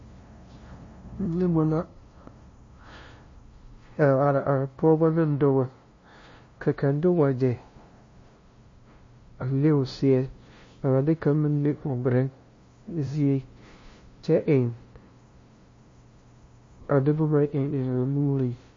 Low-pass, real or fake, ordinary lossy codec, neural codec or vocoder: 7.2 kHz; fake; MP3, 32 kbps; codec, 16 kHz, 0.5 kbps, FunCodec, trained on LibriTTS, 25 frames a second